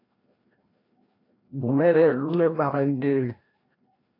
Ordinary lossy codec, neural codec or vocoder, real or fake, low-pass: AAC, 24 kbps; codec, 16 kHz, 1 kbps, FreqCodec, larger model; fake; 5.4 kHz